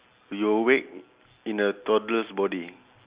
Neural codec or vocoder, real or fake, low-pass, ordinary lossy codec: none; real; 3.6 kHz; Opus, 64 kbps